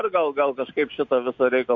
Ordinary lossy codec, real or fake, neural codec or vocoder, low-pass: MP3, 48 kbps; real; none; 7.2 kHz